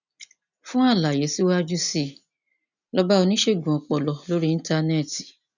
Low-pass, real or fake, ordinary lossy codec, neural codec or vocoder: 7.2 kHz; real; none; none